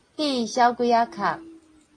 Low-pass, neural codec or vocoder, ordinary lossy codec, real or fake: 9.9 kHz; none; AAC, 32 kbps; real